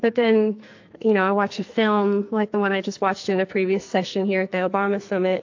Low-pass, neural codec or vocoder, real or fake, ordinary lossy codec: 7.2 kHz; codec, 44.1 kHz, 2.6 kbps, SNAC; fake; AAC, 48 kbps